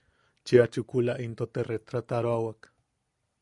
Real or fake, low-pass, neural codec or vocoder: real; 10.8 kHz; none